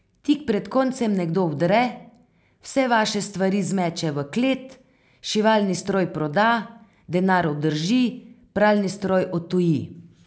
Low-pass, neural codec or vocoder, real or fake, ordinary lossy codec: none; none; real; none